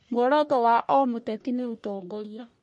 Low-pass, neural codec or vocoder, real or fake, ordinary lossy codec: 10.8 kHz; codec, 44.1 kHz, 1.7 kbps, Pupu-Codec; fake; MP3, 48 kbps